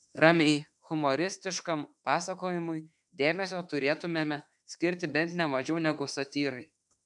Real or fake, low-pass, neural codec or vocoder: fake; 10.8 kHz; autoencoder, 48 kHz, 32 numbers a frame, DAC-VAE, trained on Japanese speech